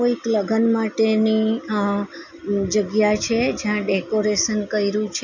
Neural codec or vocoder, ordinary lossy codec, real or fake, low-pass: none; none; real; 7.2 kHz